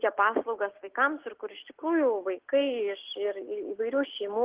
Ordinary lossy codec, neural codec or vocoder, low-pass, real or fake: Opus, 16 kbps; none; 3.6 kHz; real